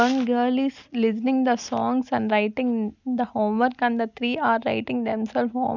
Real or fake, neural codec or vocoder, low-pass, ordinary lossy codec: real; none; 7.2 kHz; none